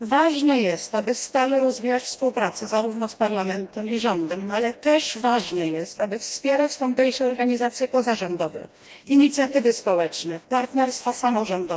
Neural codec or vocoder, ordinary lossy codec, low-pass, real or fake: codec, 16 kHz, 1 kbps, FreqCodec, smaller model; none; none; fake